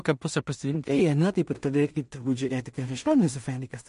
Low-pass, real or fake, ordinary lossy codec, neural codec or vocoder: 10.8 kHz; fake; MP3, 48 kbps; codec, 16 kHz in and 24 kHz out, 0.4 kbps, LongCat-Audio-Codec, two codebook decoder